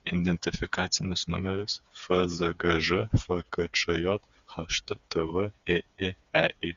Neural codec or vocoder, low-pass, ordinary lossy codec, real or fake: codec, 16 kHz, 4 kbps, FreqCodec, smaller model; 7.2 kHz; Opus, 64 kbps; fake